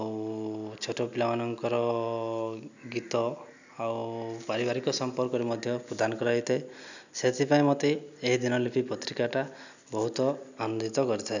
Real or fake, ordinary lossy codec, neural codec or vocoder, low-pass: real; none; none; 7.2 kHz